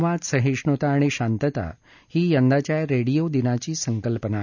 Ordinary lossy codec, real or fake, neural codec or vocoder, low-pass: none; real; none; 7.2 kHz